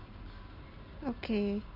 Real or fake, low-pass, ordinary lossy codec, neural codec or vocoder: real; 5.4 kHz; none; none